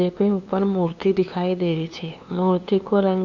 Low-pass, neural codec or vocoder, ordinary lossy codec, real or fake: 7.2 kHz; codec, 16 kHz, 2 kbps, FunCodec, trained on LibriTTS, 25 frames a second; Opus, 64 kbps; fake